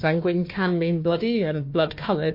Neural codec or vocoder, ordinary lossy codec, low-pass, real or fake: codec, 16 kHz, 1 kbps, FunCodec, trained on Chinese and English, 50 frames a second; MP3, 32 kbps; 5.4 kHz; fake